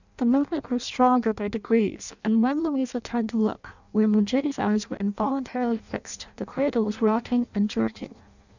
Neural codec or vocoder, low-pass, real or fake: codec, 16 kHz in and 24 kHz out, 0.6 kbps, FireRedTTS-2 codec; 7.2 kHz; fake